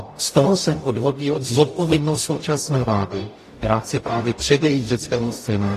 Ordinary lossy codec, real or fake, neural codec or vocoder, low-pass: AAC, 48 kbps; fake; codec, 44.1 kHz, 0.9 kbps, DAC; 14.4 kHz